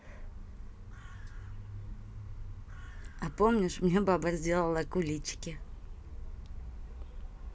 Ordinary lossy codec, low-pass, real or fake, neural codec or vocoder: none; none; real; none